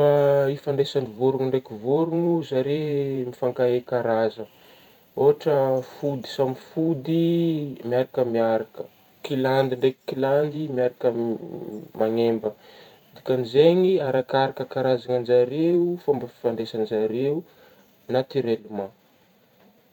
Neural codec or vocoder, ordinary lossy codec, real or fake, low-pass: vocoder, 44.1 kHz, 128 mel bands every 512 samples, BigVGAN v2; none; fake; 19.8 kHz